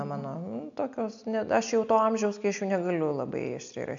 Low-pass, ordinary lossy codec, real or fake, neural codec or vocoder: 7.2 kHz; AAC, 64 kbps; real; none